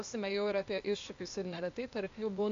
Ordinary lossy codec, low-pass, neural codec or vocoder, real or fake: AAC, 48 kbps; 7.2 kHz; codec, 16 kHz, 0.8 kbps, ZipCodec; fake